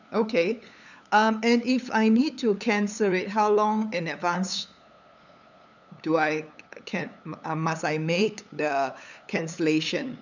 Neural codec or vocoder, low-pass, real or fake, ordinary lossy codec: codec, 16 kHz, 8 kbps, FunCodec, trained on LibriTTS, 25 frames a second; 7.2 kHz; fake; none